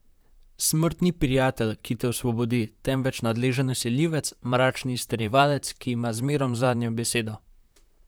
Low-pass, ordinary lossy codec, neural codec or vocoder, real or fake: none; none; vocoder, 44.1 kHz, 128 mel bands, Pupu-Vocoder; fake